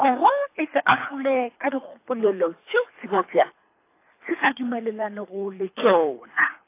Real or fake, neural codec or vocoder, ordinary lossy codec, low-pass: fake; codec, 24 kHz, 3 kbps, HILCodec; AAC, 24 kbps; 3.6 kHz